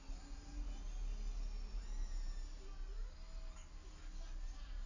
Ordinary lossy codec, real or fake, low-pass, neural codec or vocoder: none; real; 7.2 kHz; none